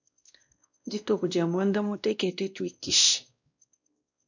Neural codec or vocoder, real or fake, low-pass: codec, 16 kHz, 1 kbps, X-Codec, WavLM features, trained on Multilingual LibriSpeech; fake; 7.2 kHz